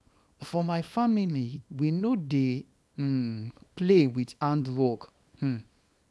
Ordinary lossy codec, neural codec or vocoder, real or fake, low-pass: none; codec, 24 kHz, 0.9 kbps, WavTokenizer, small release; fake; none